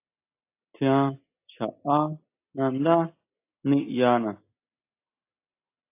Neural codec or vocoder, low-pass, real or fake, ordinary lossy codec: none; 3.6 kHz; real; AAC, 24 kbps